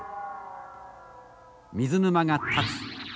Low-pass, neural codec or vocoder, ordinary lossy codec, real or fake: none; none; none; real